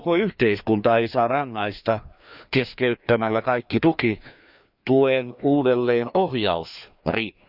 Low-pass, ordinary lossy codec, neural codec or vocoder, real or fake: 5.4 kHz; none; codec, 16 kHz, 2 kbps, FreqCodec, larger model; fake